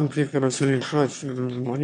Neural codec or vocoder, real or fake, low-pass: autoencoder, 22.05 kHz, a latent of 192 numbers a frame, VITS, trained on one speaker; fake; 9.9 kHz